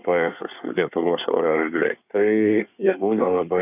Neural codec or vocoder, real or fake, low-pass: codec, 24 kHz, 1 kbps, SNAC; fake; 3.6 kHz